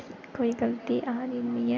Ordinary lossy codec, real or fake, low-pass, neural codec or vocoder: none; real; none; none